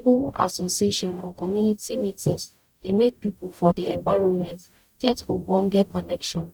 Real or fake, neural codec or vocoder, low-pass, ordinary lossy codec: fake; codec, 44.1 kHz, 0.9 kbps, DAC; 19.8 kHz; none